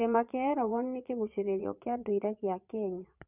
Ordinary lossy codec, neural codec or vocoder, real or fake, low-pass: none; vocoder, 22.05 kHz, 80 mel bands, Vocos; fake; 3.6 kHz